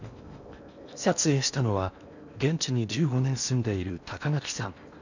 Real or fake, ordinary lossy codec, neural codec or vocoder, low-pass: fake; none; codec, 16 kHz in and 24 kHz out, 0.8 kbps, FocalCodec, streaming, 65536 codes; 7.2 kHz